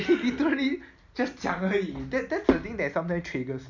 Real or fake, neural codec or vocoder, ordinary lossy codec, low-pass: real; none; none; 7.2 kHz